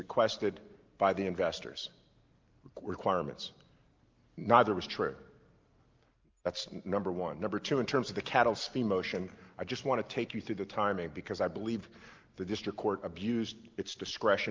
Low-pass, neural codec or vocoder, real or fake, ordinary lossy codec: 7.2 kHz; none; real; Opus, 32 kbps